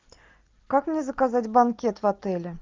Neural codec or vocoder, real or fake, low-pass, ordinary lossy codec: none; real; 7.2 kHz; Opus, 24 kbps